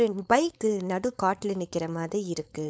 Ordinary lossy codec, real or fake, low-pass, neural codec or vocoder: none; fake; none; codec, 16 kHz, 4.8 kbps, FACodec